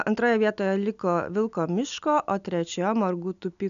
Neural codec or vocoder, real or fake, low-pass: none; real; 7.2 kHz